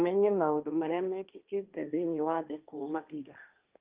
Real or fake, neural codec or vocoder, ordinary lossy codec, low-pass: fake; codec, 16 kHz, 1.1 kbps, Voila-Tokenizer; Opus, 32 kbps; 3.6 kHz